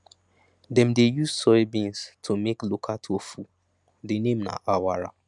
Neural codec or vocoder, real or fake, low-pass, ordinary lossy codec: none; real; 10.8 kHz; none